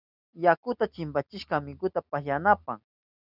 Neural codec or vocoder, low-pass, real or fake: none; 5.4 kHz; real